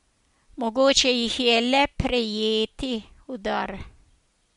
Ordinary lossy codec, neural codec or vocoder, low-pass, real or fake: MP3, 64 kbps; none; 10.8 kHz; real